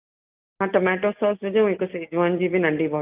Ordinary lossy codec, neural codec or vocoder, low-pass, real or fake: Opus, 32 kbps; none; 3.6 kHz; real